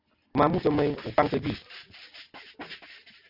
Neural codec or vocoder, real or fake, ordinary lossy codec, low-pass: none; real; Opus, 64 kbps; 5.4 kHz